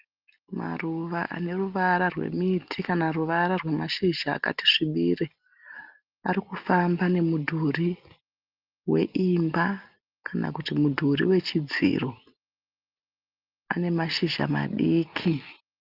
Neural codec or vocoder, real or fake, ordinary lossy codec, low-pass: none; real; Opus, 24 kbps; 5.4 kHz